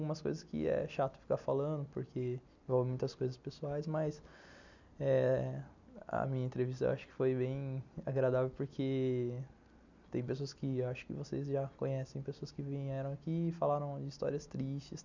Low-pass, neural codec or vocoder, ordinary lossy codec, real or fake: 7.2 kHz; none; none; real